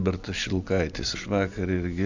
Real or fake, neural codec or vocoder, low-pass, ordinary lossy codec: real; none; 7.2 kHz; Opus, 64 kbps